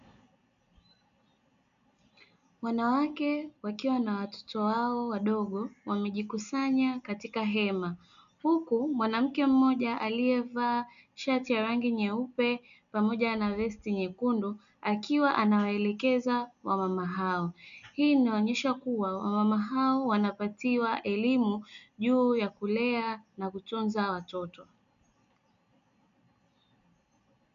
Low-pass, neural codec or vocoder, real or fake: 7.2 kHz; none; real